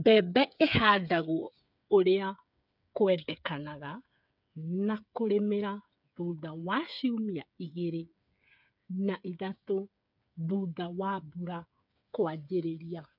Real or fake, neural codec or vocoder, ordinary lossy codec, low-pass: fake; codec, 16 kHz, 8 kbps, FreqCodec, smaller model; none; 5.4 kHz